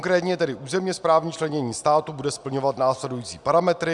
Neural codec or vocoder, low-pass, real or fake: none; 10.8 kHz; real